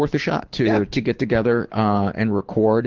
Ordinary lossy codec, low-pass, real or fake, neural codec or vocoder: Opus, 16 kbps; 7.2 kHz; fake; codec, 24 kHz, 6 kbps, HILCodec